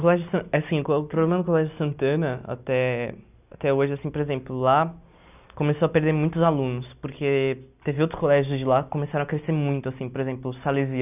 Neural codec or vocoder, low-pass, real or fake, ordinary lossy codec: none; 3.6 kHz; real; none